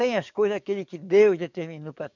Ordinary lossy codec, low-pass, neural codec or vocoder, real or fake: none; 7.2 kHz; codec, 44.1 kHz, 7.8 kbps, DAC; fake